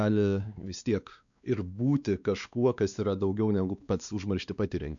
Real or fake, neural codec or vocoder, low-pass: fake; codec, 16 kHz, 2 kbps, X-Codec, WavLM features, trained on Multilingual LibriSpeech; 7.2 kHz